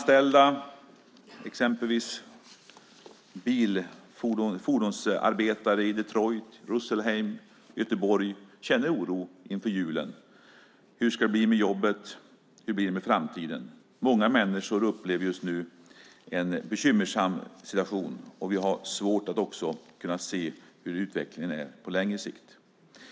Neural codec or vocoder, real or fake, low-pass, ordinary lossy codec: none; real; none; none